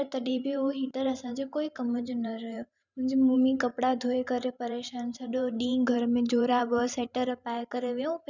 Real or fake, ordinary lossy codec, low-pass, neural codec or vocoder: fake; none; 7.2 kHz; vocoder, 44.1 kHz, 128 mel bands every 512 samples, BigVGAN v2